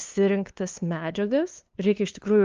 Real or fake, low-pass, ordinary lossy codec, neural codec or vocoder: fake; 7.2 kHz; Opus, 32 kbps; codec, 16 kHz, 2 kbps, FunCodec, trained on LibriTTS, 25 frames a second